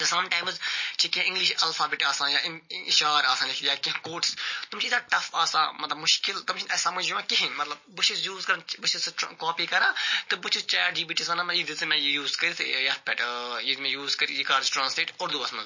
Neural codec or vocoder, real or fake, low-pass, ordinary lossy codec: none; real; 7.2 kHz; MP3, 32 kbps